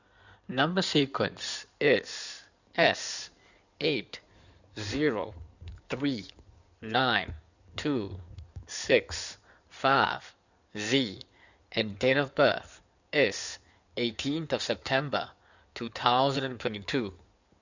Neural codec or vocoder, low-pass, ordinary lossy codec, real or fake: codec, 16 kHz in and 24 kHz out, 2.2 kbps, FireRedTTS-2 codec; 7.2 kHz; none; fake